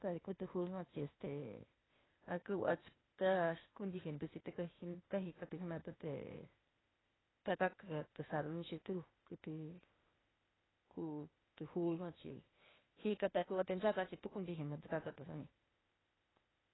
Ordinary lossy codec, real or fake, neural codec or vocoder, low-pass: AAC, 16 kbps; fake; codec, 16 kHz, 0.8 kbps, ZipCodec; 7.2 kHz